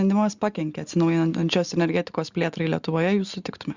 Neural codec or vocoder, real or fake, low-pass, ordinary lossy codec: none; real; 7.2 kHz; Opus, 64 kbps